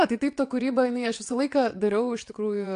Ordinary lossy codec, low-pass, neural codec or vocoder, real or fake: AAC, 64 kbps; 9.9 kHz; vocoder, 22.05 kHz, 80 mel bands, WaveNeXt; fake